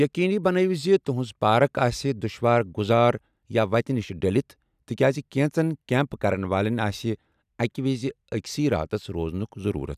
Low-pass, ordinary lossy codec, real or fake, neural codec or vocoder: 14.4 kHz; none; real; none